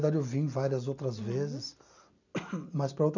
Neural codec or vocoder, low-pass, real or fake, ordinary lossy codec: none; 7.2 kHz; real; none